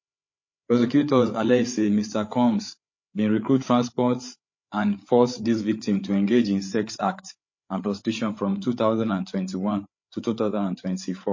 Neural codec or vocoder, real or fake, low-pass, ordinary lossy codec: codec, 16 kHz, 8 kbps, FreqCodec, larger model; fake; 7.2 kHz; MP3, 32 kbps